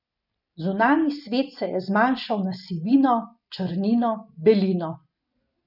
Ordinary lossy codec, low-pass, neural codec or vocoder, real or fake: none; 5.4 kHz; none; real